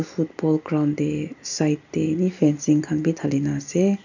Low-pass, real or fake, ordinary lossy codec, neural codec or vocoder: 7.2 kHz; real; none; none